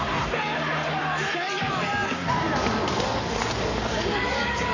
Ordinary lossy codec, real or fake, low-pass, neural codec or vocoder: none; fake; 7.2 kHz; codec, 44.1 kHz, 7.8 kbps, Pupu-Codec